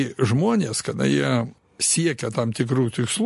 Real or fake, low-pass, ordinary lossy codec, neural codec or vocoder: real; 14.4 kHz; MP3, 48 kbps; none